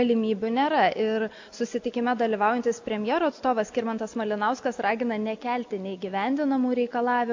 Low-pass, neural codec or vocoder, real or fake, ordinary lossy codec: 7.2 kHz; none; real; AAC, 48 kbps